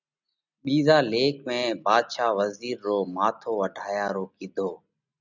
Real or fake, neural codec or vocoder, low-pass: real; none; 7.2 kHz